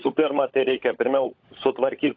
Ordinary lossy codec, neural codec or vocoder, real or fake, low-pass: AAC, 48 kbps; codec, 16 kHz, 16 kbps, FunCodec, trained on LibriTTS, 50 frames a second; fake; 7.2 kHz